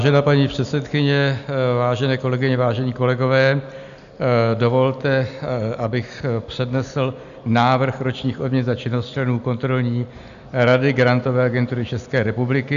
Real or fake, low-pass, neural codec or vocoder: real; 7.2 kHz; none